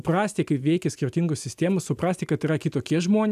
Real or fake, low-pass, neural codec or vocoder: real; 14.4 kHz; none